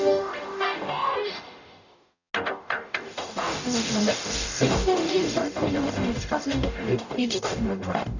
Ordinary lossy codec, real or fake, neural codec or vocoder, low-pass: none; fake; codec, 44.1 kHz, 0.9 kbps, DAC; 7.2 kHz